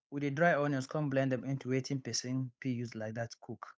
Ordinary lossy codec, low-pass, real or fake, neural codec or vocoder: none; none; fake; codec, 16 kHz, 8 kbps, FunCodec, trained on Chinese and English, 25 frames a second